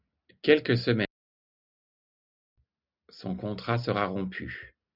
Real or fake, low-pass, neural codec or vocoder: real; 5.4 kHz; none